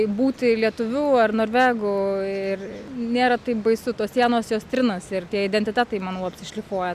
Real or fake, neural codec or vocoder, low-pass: real; none; 14.4 kHz